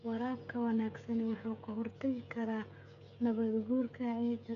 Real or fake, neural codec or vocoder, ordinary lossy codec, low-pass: fake; codec, 16 kHz, 8 kbps, FreqCodec, smaller model; none; 7.2 kHz